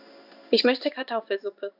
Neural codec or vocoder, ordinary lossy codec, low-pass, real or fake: codec, 16 kHz in and 24 kHz out, 1 kbps, XY-Tokenizer; none; 5.4 kHz; fake